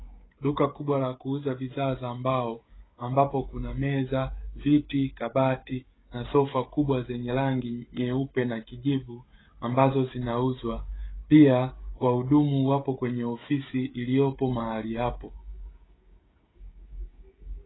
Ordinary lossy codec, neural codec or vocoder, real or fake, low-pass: AAC, 16 kbps; codec, 16 kHz, 16 kbps, FreqCodec, smaller model; fake; 7.2 kHz